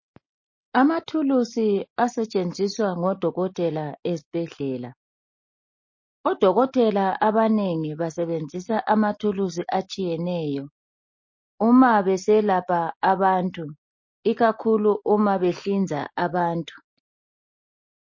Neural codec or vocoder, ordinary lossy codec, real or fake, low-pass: none; MP3, 32 kbps; real; 7.2 kHz